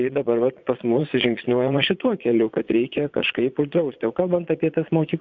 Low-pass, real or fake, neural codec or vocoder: 7.2 kHz; fake; vocoder, 22.05 kHz, 80 mel bands, Vocos